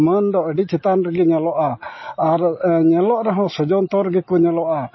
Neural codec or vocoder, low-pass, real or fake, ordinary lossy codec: none; 7.2 kHz; real; MP3, 24 kbps